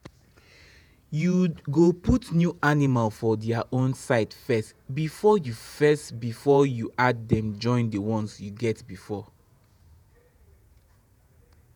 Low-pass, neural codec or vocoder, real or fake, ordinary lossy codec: none; vocoder, 48 kHz, 128 mel bands, Vocos; fake; none